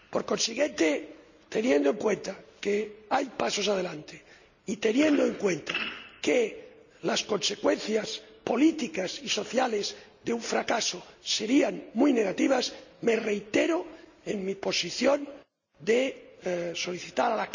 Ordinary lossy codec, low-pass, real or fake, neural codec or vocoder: none; 7.2 kHz; real; none